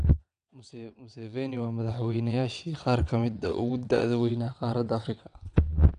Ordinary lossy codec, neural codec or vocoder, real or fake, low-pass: none; vocoder, 22.05 kHz, 80 mel bands, WaveNeXt; fake; 9.9 kHz